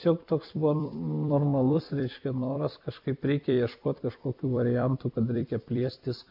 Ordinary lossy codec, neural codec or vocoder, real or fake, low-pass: MP3, 48 kbps; vocoder, 44.1 kHz, 128 mel bands every 256 samples, BigVGAN v2; fake; 5.4 kHz